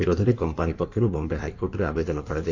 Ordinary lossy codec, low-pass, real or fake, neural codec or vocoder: none; 7.2 kHz; fake; codec, 16 kHz in and 24 kHz out, 1.1 kbps, FireRedTTS-2 codec